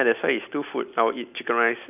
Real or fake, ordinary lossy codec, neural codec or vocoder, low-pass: real; none; none; 3.6 kHz